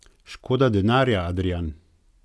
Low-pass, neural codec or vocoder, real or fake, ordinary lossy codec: none; none; real; none